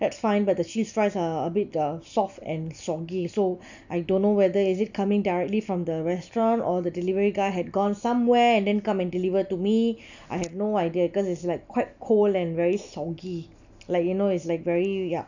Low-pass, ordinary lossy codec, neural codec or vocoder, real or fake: 7.2 kHz; none; none; real